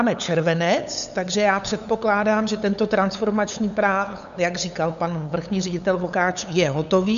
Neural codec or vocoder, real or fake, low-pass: codec, 16 kHz, 8 kbps, FunCodec, trained on LibriTTS, 25 frames a second; fake; 7.2 kHz